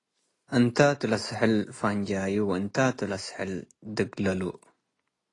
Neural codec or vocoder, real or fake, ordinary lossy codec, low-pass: none; real; AAC, 32 kbps; 10.8 kHz